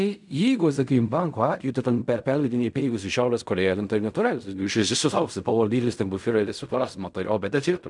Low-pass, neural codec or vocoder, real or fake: 10.8 kHz; codec, 16 kHz in and 24 kHz out, 0.4 kbps, LongCat-Audio-Codec, fine tuned four codebook decoder; fake